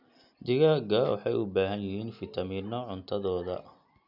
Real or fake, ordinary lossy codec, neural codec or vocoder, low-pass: real; none; none; 5.4 kHz